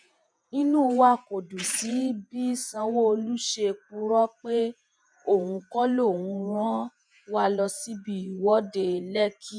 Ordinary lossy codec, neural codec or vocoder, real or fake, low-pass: none; vocoder, 48 kHz, 128 mel bands, Vocos; fake; 9.9 kHz